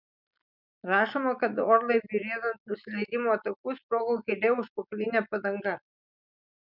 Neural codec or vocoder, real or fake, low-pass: none; real; 5.4 kHz